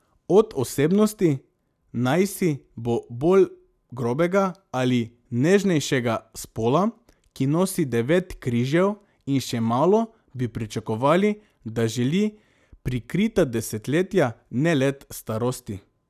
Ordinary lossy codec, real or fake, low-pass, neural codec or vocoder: none; real; 14.4 kHz; none